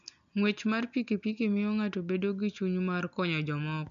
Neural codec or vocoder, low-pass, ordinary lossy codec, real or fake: none; 7.2 kHz; none; real